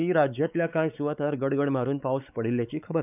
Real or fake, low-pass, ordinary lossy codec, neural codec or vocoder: fake; 3.6 kHz; none; codec, 16 kHz, 4 kbps, X-Codec, WavLM features, trained on Multilingual LibriSpeech